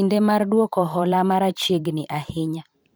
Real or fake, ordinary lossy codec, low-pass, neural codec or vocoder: fake; none; none; vocoder, 44.1 kHz, 128 mel bands every 512 samples, BigVGAN v2